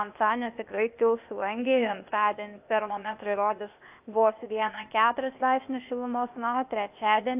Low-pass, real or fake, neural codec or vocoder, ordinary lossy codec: 3.6 kHz; fake; codec, 16 kHz, 0.8 kbps, ZipCodec; AAC, 32 kbps